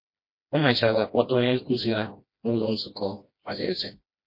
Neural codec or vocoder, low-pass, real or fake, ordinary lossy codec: codec, 16 kHz, 1 kbps, FreqCodec, smaller model; 5.4 kHz; fake; MP3, 32 kbps